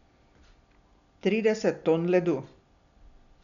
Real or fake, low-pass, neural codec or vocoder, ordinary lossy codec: real; 7.2 kHz; none; none